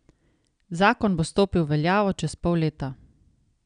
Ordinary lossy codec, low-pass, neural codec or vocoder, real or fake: none; 9.9 kHz; none; real